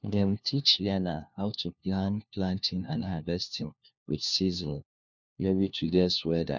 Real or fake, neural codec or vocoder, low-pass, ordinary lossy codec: fake; codec, 16 kHz, 1 kbps, FunCodec, trained on LibriTTS, 50 frames a second; 7.2 kHz; none